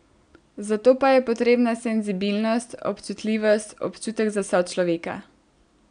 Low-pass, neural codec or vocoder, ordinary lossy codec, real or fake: 9.9 kHz; none; none; real